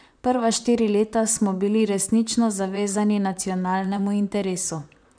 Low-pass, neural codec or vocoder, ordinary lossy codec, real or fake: 9.9 kHz; vocoder, 44.1 kHz, 128 mel bands, Pupu-Vocoder; none; fake